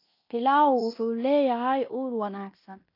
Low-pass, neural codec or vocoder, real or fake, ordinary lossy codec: 5.4 kHz; codec, 16 kHz in and 24 kHz out, 0.9 kbps, LongCat-Audio-Codec, fine tuned four codebook decoder; fake; AAC, 32 kbps